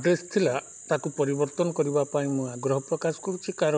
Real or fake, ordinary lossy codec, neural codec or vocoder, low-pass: real; none; none; none